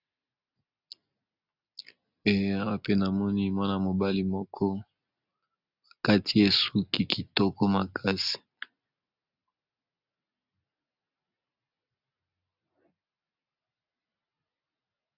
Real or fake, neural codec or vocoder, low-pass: real; none; 5.4 kHz